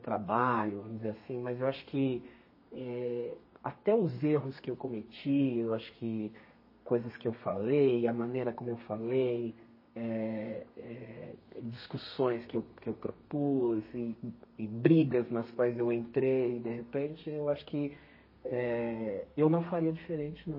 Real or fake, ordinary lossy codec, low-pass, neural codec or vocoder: fake; MP3, 24 kbps; 5.4 kHz; codec, 32 kHz, 1.9 kbps, SNAC